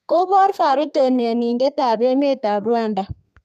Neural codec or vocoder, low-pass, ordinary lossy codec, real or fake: codec, 32 kHz, 1.9 kbps, SNAC; 14.4 kHz; none; fake